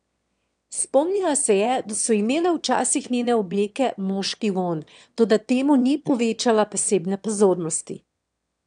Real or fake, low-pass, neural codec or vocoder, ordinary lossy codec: fake; 9.9 kHz; autoencoder, 22.05 kHz, a latent of 192 numbers a frame, VITS, trained on one speaker; AAC, 96 kbps